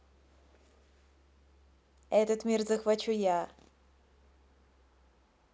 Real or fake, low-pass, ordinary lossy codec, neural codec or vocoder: real; none; none; none